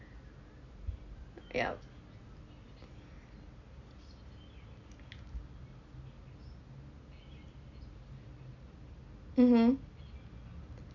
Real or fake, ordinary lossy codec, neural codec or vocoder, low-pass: real; none; none; 7.2 kHz